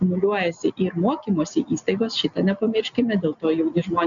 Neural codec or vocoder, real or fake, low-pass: none; real; 7.2 kHz